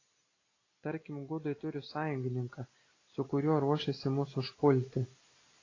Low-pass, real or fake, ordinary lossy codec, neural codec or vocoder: 7.2 kHz; real; AAC, 32 kbps; none